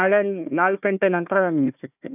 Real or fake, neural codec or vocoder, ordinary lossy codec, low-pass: fake; codec, 16 kHz, 1 kbps, FunCodec, trained on Chinese and English, 50 frames a second; none; 3.6 kHz